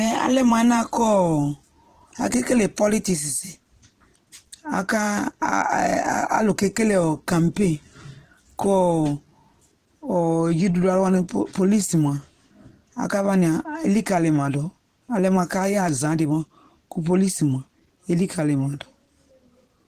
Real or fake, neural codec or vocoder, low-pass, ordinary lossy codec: fake; vocoder, 44.1 kHz, 128 mel bands every 512 samples, BigVGAN v2; 14.4 kHz; Opus, 16 kbps